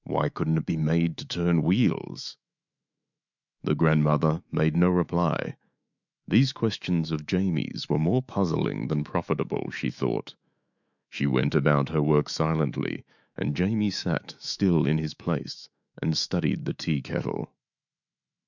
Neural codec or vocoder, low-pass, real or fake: autoencoder, 48 kHz, 128 numbers a frame, DAC-VAE, trained on Japanese speech; 7.2 kHz; fake